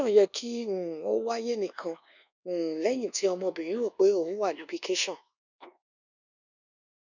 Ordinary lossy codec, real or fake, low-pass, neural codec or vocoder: none; fake; 7.2 kHz; codec, 24 kHz, 1.2 kbps, DualCodec